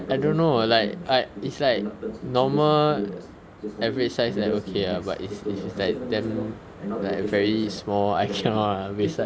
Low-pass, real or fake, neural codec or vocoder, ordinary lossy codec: none; real; none; none